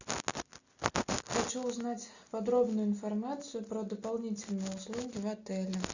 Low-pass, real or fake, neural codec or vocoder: 7.2 kHz; real; none